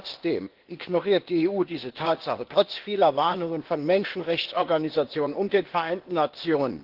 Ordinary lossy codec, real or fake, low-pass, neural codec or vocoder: Opus, 16 kbps; fake; 5.4 kHz; codec, 16 kHz, 0.8 kbps, ZipCodec